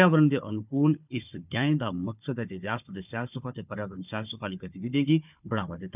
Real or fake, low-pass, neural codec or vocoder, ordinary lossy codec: fake; 3.6 kHz; codec, 16 kHz, 4 kbps, FunCodec, trained on Chinese and English, 50 frames a second; none